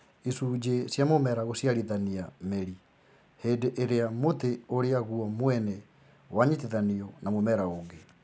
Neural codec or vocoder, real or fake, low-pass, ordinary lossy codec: none; real; none; none